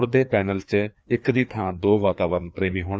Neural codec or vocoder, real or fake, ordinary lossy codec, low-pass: codec, 16 kHz, 2 kbps, FreqCodec, larger model; fake; none; none